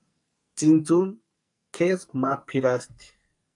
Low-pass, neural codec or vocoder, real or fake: 10.8 kHz; codec, 44.1 kHz, 2.6 kbps, SNAC; fake